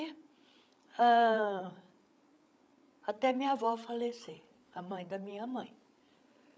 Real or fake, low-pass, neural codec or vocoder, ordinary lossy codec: fake; none; codec, 16 kHz, 8 kbps, FreqCodec, larger model; none